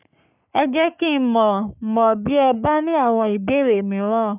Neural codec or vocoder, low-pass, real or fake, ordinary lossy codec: codec, 44.1 kHz, 3.4 kbps, Pupu-Codec; 3.6 kHz; fake; none